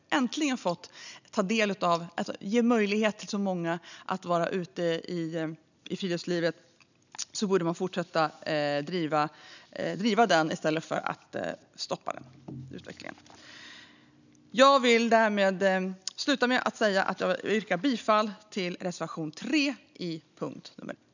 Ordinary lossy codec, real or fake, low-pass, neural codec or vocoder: none; real; 7.2 kHz; none